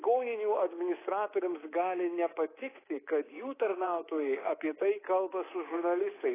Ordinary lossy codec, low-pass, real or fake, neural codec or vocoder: AAC, 16 kbps; 3.6 kHz; fake; codec, 24 kHz, 3.1 kbps, DualCodec